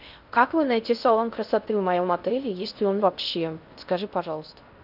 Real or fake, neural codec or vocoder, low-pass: fake; codec, 16 kHz in and 24 kHz out, 0.6 kbps, FocalCodec, streaming, 2048 codes; 5.4 kHz